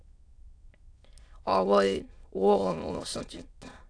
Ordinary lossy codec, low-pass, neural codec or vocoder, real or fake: AAC, 64 kbps; 9.9 kHz; autoencoder, 22.05 kHz, a latent of 192 numbers a frame, VITS, trained on many speakers; fake